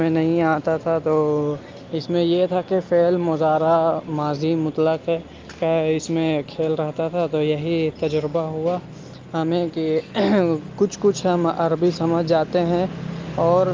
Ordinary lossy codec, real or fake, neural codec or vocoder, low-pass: Opus, 32 kbps; real; none; 7.2 kHz